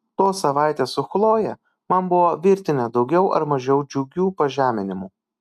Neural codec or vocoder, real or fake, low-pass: none; real; 14.4 kHz